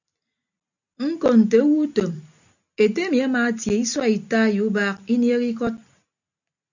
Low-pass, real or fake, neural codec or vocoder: 7.2 kHz; real; none